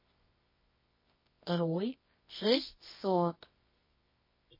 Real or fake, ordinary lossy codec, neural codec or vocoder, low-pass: fake; MP3, 24 kbps; codec, 24 kHz, 0.9 kbps, WavTokenizer, medium music audio release; 5.4 kHz